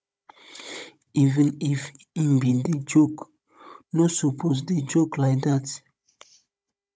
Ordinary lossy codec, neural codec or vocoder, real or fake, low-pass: none; codec, 16 kHz, 16 kbps, FunCodec, trained on Chinese and English, 50 frames a second; fake; none